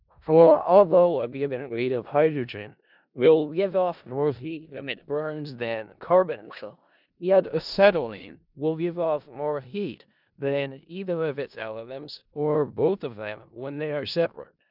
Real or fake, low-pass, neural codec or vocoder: fake; 5.4 kHz; codec, 16 kHz in and 24 kHz out, 0.4 kbps, LongCat-Audio-Codec, four codebook decoder